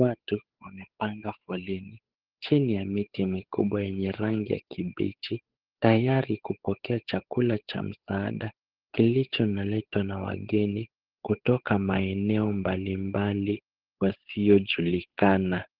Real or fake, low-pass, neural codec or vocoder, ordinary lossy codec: fake; 5.4 kHz; codec, 16 kHz, 8 kbps, FunCodec, trained on Chinese and English, 25 frames a second; Opus, 16 kbps